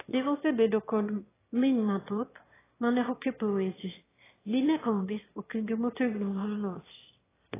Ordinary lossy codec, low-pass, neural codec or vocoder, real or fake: AAC, 16 kbps; 3.6 kHz; autoencoder, 22.05 kHz, a latent of 192 numbers a frame, VITS, trained on one speaker; fake